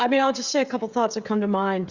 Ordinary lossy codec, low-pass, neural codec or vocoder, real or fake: Opus, 64 kbps; 7.2 kHz; codec, 16 kHz, 16 kbps, FreqCodec, smaller model; fake